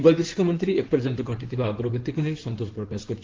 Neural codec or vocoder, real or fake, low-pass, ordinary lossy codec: codec, 16 kHz in and 24 kHz out, 2.2 kbps, FireRedTTS-2 codec; fake; 7.2 kHz; Opus, 16 kbps